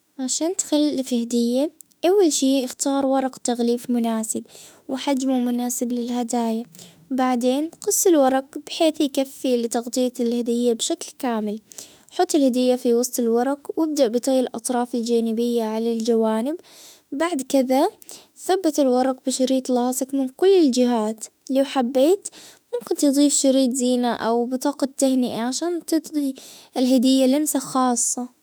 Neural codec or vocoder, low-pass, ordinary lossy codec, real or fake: autoencoder, 48 kHz, 32 numbers a frame, DAC-VAE, trained on Japanese speech; none; none; fake